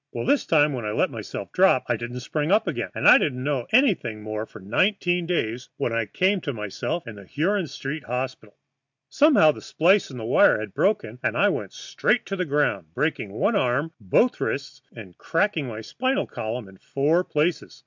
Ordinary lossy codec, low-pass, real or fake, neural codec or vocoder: MP3, 64 kbps; 7.2 kHz; real; none